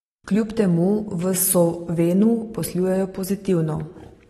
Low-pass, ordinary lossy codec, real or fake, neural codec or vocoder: 19.8 kHz; AAC, 32 kbps; real; none